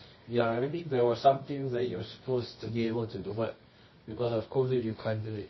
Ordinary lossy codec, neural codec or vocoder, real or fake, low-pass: MP3, 24 kbps; codec, 24 kHz, 0.9 kbps, WavTokenizer, medium music audio release; fake; 7.2 kHz